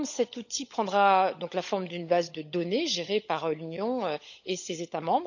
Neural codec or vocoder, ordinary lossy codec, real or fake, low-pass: codec, 16 kHz, 16 kbps, FunCodec, trained on LibriTTS, 50 frames a second; none; fake; 7.2 kHz